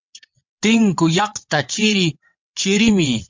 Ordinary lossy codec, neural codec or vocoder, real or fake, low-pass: MP3, 64 kbps; vocoder, 22.05 kHz, 80 mel bands, WaveNeXt; fake; 7.2 kHz